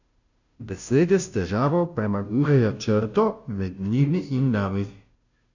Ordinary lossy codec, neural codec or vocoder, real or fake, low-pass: none; codec, 16 kHz, 0.5 kbps, FunCodec, trained on Chinese and English, 25 frames a second; fake; 7.2 kHz